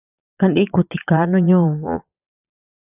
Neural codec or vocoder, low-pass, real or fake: vocoder, 22.05 kHz, 80 mel bands, Vocos; 3.6 kHz; fake